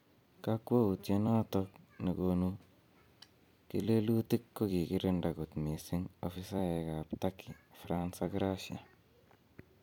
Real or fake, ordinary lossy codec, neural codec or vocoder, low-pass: fake; none; vocoder, 44.1 kHz, 128 mel bands every 256 samples, BigVGAN v2; 19.8 kHz